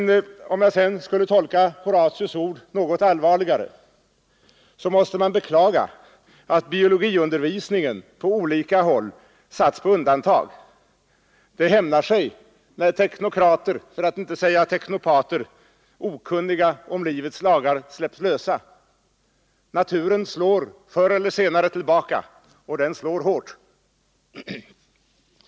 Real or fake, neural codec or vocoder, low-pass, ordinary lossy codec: real; none; none; none